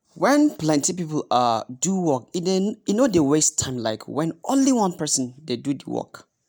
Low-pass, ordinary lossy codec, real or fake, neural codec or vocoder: none; none; real; none